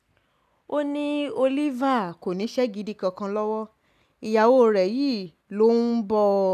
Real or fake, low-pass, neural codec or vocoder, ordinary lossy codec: real; 14.4 kHz; none; none